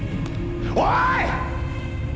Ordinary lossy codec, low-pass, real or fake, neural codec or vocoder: none; none; real; none